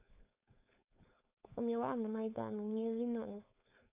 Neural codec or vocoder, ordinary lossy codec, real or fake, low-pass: codec, 16 kHz, 4.8 kbps, FACodec; none; fake; 3.6 kHz